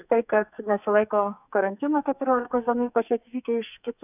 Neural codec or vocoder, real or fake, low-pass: codec, 44.1 kHz, 2.6 kbps, SNAC; fake; 3.6 kHz